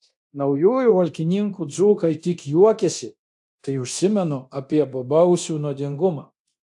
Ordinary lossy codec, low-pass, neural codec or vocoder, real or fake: AAC, 64 kbps; 10.8 kHz; codec, 24 kHz, 0.9 kbps, DualCodec; fake